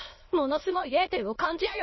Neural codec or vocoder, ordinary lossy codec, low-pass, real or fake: autoencoder, 22.05 kHz, a latent of 192 numbers a frame, VITS, trained on many speakers; MP3, 24 kbps; 7.2 kHz; fake